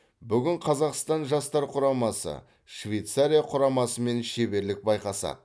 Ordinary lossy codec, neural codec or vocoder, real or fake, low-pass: none; none; real; none